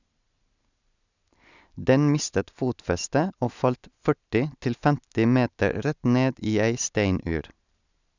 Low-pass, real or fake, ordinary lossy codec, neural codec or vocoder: 7.2 kHz; real; none; none